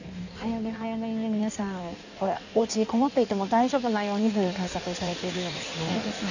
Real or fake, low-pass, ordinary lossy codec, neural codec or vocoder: fake; 7.2 kHz; none; codec, 16 kHz in and 24 kHz out, 1.1 kbps, FireRedTTS-2 codec